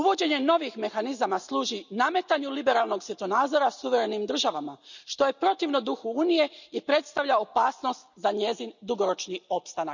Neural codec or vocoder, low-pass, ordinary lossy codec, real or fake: none; 7.2 kHz; none; real